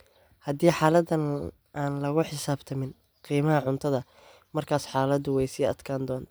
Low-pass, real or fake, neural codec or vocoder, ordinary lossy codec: none; real; none; none